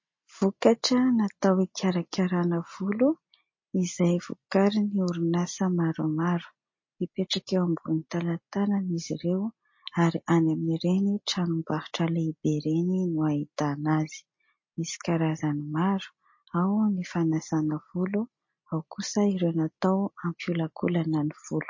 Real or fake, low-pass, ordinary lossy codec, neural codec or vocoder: real; 7.2 kHz; MP3, 32 kbps; none